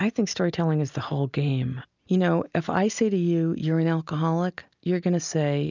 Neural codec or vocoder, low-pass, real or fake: none; 7.2 kHz; real